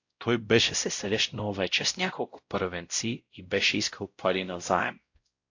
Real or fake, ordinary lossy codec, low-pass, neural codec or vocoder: fake; AAC, 48 kbps; 7.2 kHz; codec, 16 kHz, 0.5 kbps, X-Codec, WavLM features, trained on Multilingual LibriSpeech